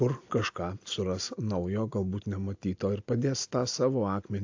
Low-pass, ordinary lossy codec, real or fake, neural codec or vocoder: 7.2 kHz; AAC, 48 kbps; real; none